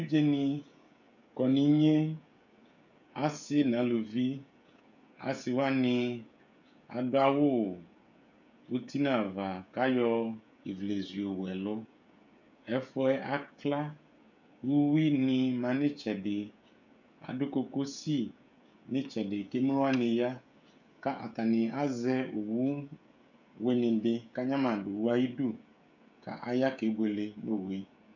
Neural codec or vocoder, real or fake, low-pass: codec, 16 kHz, 16 kbps, FreqCodec, smaller model; fake; 7.2 kHz